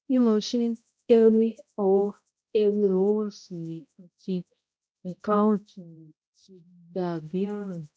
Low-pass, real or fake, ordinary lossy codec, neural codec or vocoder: none; fake; none; codec, 16 kHz, 0.5 kbps, X-Codec, HuBERT features, trained on balanced general audio